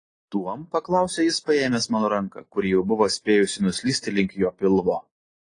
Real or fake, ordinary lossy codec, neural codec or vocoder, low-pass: real; AAC, 32 kbps; none; 9.9 kHz